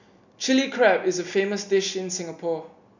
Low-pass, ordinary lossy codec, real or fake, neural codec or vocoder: 7.2 kHz; none; real; none